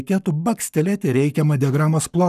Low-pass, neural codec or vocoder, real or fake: 14.4 kHz; codec, 44.1 kHz, 7.8 kbps, Pupu-Codec; fake